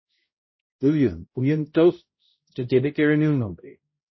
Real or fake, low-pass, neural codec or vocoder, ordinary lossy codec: fake; 7.2 kHz; codec, 16 kHz, 0.5 kbps, X-Codec, HuBERT features, trained on balanced general audio; MP3, 24 kbps